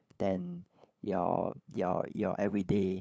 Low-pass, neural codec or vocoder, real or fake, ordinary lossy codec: none; codec, 16 kHz, 8 kbps, FunCodec, trained on LibriTTS, 25 frames a second; fake; none